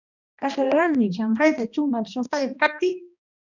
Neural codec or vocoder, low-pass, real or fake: codec, 16 kHz, 1 kbps, X-Codec, HuBERT features, trained on balanced general audio; 7.2 kHz; fake